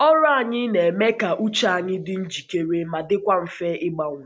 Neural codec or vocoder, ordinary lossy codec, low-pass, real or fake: none; none; none; real